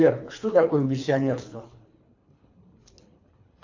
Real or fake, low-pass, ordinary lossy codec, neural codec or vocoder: fake; 7.2 kHz; AAC, 48 kbps; codec, 24 kHz, 3 kbps, HILCodec